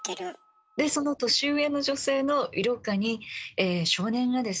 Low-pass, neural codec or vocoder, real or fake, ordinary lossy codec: none; none; real; none